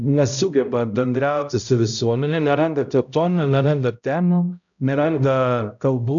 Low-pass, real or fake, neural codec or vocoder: 7.2 kHz; fake; codec, 16 kHz, 0.5 kbps, X-Codec, HuBERT features, trained on balanced general audio